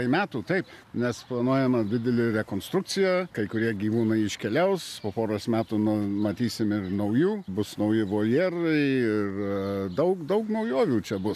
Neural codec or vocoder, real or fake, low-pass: none; real; 14.4 kHz